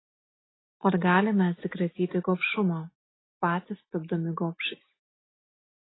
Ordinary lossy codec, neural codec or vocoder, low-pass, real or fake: AAC, 16 kbps; none; 7.2 kHz; real